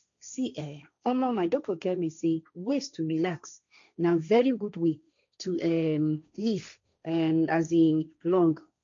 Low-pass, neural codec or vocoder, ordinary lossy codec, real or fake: 7.2 kHz; codec, 16 kHz, 1.1 kbps, Voila-Tokenizer; MP3, 64 kbps; fake